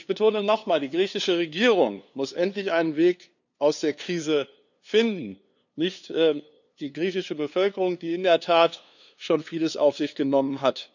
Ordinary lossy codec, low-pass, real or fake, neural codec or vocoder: none; 7.2 kHz; fake; codec, 16 kHz, 2 kbps, FunCodec, trained on LibriTTS, 25 frames a second